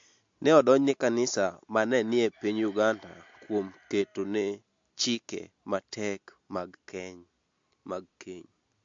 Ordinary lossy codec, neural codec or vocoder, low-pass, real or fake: MP3, 48 kbps; none; 7.2 kHz; real